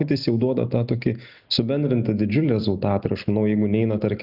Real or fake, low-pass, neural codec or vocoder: real; 5.4 kHz; none